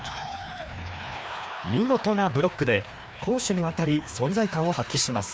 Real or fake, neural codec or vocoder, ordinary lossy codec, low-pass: fake; codec, 16 kHz, 2 kbps, FreqCodec, larger model; none; none